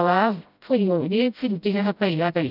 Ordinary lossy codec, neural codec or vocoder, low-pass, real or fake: none; codec, 16 kHz, 0.5 kbps, FreqCodec, smaller model; 5.4 kHz; fake